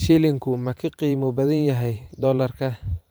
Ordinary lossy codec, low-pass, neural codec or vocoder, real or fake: none; none; vocoder, 44.1 kHz, 128 mel bands every 512 samples, BigVGAN v2; fake